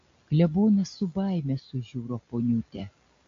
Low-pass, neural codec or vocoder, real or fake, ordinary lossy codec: 7.2 kHz; none; real; MP3, 48 kbps